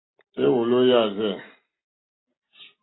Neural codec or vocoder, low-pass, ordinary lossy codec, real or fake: none; 7.2 kHz; AAC, 16 kbps; real